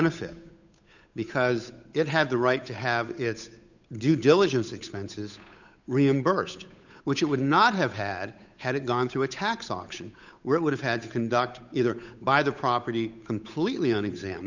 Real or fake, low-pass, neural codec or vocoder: fake; 7.2 kHz; codec, 16 kHz, 8 kbps, FunCodec, trained on Chinese and English, 25 frames a second